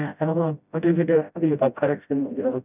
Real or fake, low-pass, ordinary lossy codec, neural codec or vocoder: fake; 3.6 kHz; none; codec, 16 kHz, 0.5 kbps, FreqCodec, smaller model